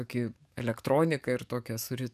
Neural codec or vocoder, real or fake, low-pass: codec, 44.1 kHz, 7.8 kbps, DAC; fake; 14.4 kHz